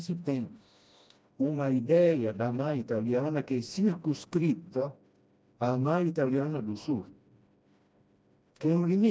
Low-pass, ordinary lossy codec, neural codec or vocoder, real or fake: none; none; codec, 16 kHz, 1 kbps, FreqCodec, smaller model; fake